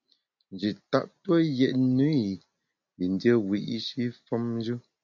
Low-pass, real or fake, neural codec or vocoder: 7.2 kHz; real; none